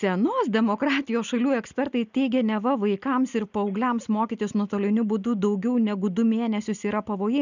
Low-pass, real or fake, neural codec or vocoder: 7.2 kHz; real; none